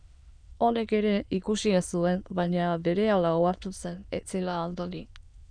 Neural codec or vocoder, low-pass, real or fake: autoencoder, 22.05 kHz, a latent of 192 numbers a frame, VITS, trained on many speakers; 9.9 kHz; fake